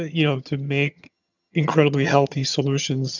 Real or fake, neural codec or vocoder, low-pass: fake; vocoder, 22.05 kHz, 80 mel bands, HiFi-GAN; 7.2 kHz